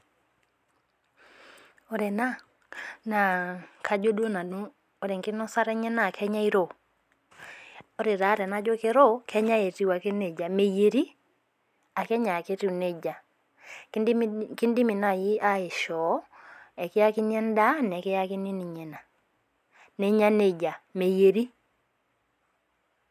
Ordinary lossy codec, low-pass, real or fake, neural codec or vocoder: none; 14.4 kHz; real; none